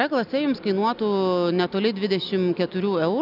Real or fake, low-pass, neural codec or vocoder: real; 5.4 kHz; none